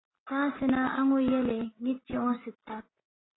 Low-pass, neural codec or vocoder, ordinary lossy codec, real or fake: 7.2 kHz; none; AAC, 16 kbps; real